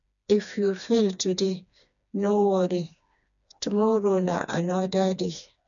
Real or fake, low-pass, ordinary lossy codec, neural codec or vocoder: fake; 7.2 kHz; none; codec, 16 kHz, 2 kbps, FreqCodec, smaller model